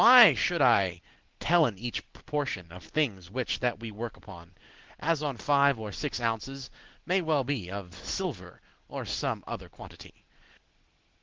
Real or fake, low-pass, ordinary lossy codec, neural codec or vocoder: real; 7.2 kHz; Opus, 16 kbps; none